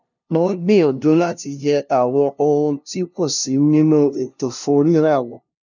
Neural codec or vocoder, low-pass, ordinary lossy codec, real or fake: codec, 16 kHz, 0.5 kbps, FunCodec, trained on LibriTTS, 25 frames a second; 7.2 kHz; none; fake